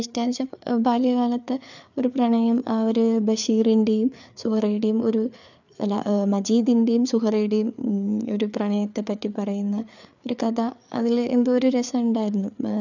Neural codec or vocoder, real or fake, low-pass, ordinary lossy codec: codec, 16 kHz, 4 kbps, FreqCodec, larger model; fake; 7.2 kHz; none